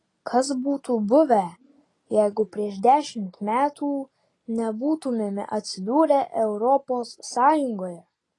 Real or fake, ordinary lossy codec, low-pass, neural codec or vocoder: real; AAC, 32 kbps; 10.8 kHz; none